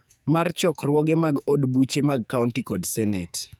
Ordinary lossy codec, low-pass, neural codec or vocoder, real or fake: none; none; codec, 44.1 kHz, 2.6 kbps, SNAC; fake